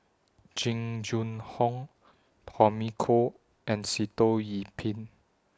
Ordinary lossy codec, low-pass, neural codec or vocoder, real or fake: none; none; none; real